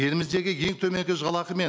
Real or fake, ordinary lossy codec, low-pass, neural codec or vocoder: real; none; none; none